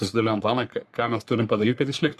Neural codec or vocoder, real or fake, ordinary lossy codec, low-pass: codec, 44.1 kHz, 3.4 kbps, Pupu-Codec; fake; Opus, 64 kbps; 14.4 kHz